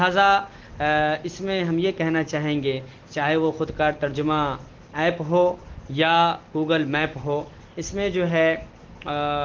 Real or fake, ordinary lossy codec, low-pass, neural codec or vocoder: real; Opus, 16 kbps; 7.2 kHz; none